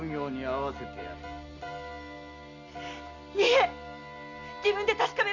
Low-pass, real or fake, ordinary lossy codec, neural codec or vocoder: 7.2 kHz; real; none; none